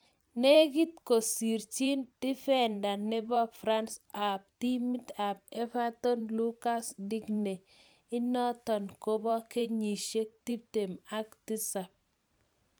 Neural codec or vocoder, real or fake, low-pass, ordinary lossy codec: vocoder, 44.1 kHz, 128 mel bands every 512 samples, BigVGAN v2; fake; none; none